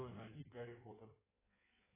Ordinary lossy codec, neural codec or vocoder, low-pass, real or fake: AAC, 16 kbps; codec, 16 kHz in and 24 kHz out, 1.1 kbps, FireRedTTS-2 codec; 3.6 kHz; fake